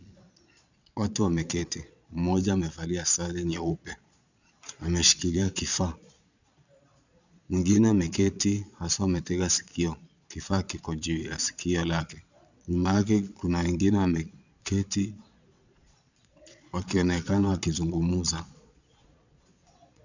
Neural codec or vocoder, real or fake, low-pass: vocoder, 22.05 kHz, 80 mel bands, Vocos; fake; 7.2 kHz